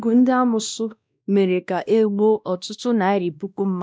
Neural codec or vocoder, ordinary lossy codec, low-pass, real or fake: codec, 16 kHz, 1 kbps, X-Codec, WavLM features, trained on Multilingual LibriSpeech; none; none; fake